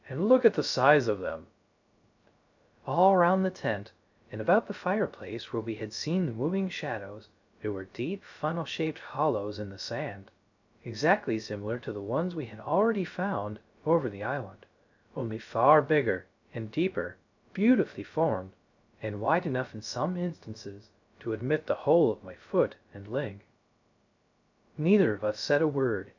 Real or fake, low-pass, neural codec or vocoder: fake; 7.2 kHz; codec, 16 kHz, 0.3 kbps, FocalCodec